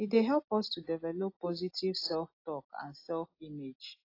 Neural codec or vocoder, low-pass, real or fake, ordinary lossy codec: none; 5.4 kHz; real; AAC, 32 kbps